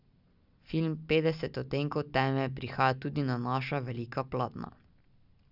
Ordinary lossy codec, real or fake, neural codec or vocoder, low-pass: none; real; none; 5.4 kHz